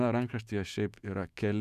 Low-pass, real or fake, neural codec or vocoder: 14.4 kHz; fake; autoencoder, 48 kHz, 128 numbers a frame, DAC-VAE, trained on Japanese speech